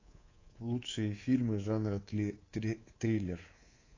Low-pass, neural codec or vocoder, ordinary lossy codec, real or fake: 7.2 kHz; codec, 24 kHz, 3.1 kbps, DualCodec; AAC, 32 kbps; fake